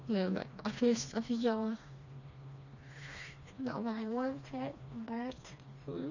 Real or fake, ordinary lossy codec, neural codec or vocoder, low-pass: fake; none; codec, 16 kHz, 2 kbps, FreqCodec, smaller model; 7.2 kHz